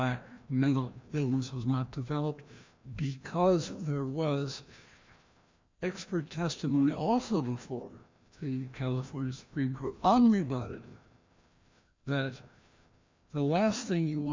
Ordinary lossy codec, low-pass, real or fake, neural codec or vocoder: MP3, 64 kbps; 7.2 kHz; fake; codec, 16 kHz, 1 kbps, FreqCodec, larger model